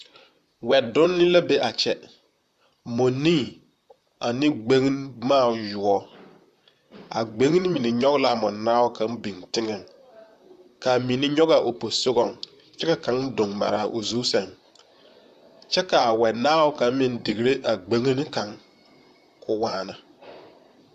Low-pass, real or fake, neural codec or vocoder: 14.4 kHz; fake; vocoder, 44.1 kHz, 128 mel bands, Pupu-Vocoder